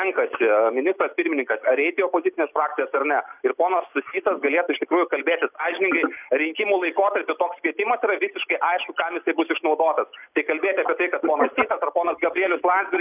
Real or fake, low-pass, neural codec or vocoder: real; 3.6 kHz; none